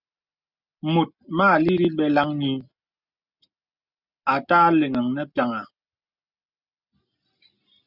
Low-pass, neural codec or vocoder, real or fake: 5.4 kHz; none; real